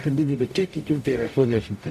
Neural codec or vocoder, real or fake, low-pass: codec, 44.1 kHz, 0.9 kbps, DAC; fake; 14.4 kHz